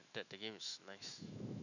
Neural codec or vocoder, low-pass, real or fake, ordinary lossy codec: autoencoder, 48 kHz, 128 numbers a frame, DAC-VAE, trained on Japanese speech; 7.2 kHz; fake; none